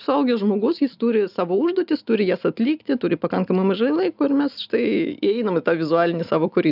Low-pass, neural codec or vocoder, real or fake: 5.4 kHz; none; real